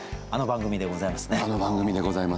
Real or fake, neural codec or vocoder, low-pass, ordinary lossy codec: real; none; none; none